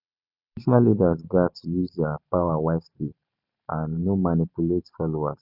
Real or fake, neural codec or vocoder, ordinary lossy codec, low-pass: fake; vocoder, 44.1 kHz, 128 mel bands every 512 samples, BigVGAN v2; none; 5.4 kHz